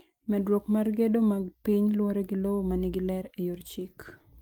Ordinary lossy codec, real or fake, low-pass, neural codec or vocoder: Opus, 32 kbps; real; 19.8 kHz; none